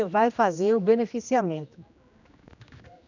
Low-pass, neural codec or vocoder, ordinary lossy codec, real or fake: 7.2 kHz; codec, 16 kHz, 1 kbps, X-Codec, HuBERT features, trained on general audio; none; fake